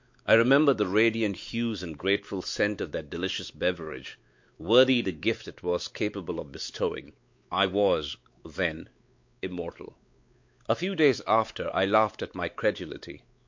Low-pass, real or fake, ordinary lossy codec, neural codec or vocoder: 7.2 kHz; fake; MP3, 48 kbps; codec, 16 kHz, 4 kbps, X-Codec, WavLM features, trained on Multilingual LibriSpeech